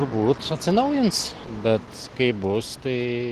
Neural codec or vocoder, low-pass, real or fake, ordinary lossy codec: none; 14.4 kHz; real; Opus, 16 kbps